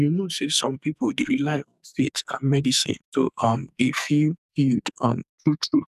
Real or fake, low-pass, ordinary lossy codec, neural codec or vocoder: fake; 14.4 kHz; none; codec, 44.1 kHz, 2.6 kbps, SNAC